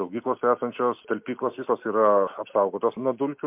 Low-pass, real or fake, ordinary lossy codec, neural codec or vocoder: 3.6 kHz; real; MP3, 32 kbps; none